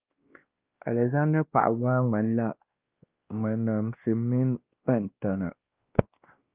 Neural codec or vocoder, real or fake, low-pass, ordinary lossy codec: codec, 16 kHz, 1 kbps, X-Codec, WavLM features, trained on Multilingual LibriSpeech; fake; 3.6 kHz; Opus, 24 kbps